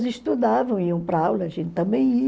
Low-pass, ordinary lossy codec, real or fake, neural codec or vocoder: none; none; real; none